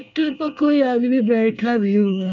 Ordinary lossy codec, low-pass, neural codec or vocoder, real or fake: none; 7.2 kHz; codec, 24 kHz, 1 kbps, SNAC; fake